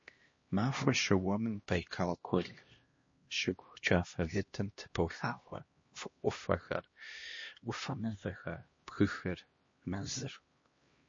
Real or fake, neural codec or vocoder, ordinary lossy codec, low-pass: fake; codec, 16 kHz, 1 kbps, X-Codec, HuBERT features, trained on LibriSpeech; MP3, 32 kbps; 7.2 kHz